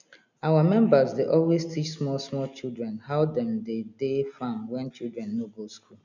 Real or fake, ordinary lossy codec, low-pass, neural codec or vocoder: real; none; 7.2 kHz; none